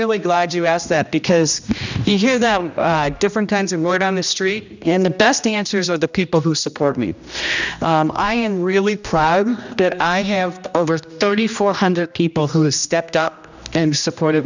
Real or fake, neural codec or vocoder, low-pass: fake; codec, 16 kHz, 1 kbps, X-Codec, HuBERT features, trained on general audio; 7.2 kHz